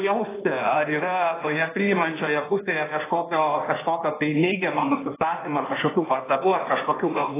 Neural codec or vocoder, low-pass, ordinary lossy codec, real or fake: codec, 16 kHz in and 24 kHz out, 1.1 kbps, FireRedTTS-2 codec; 3.6 kHz; AAC, 16 kbps; fake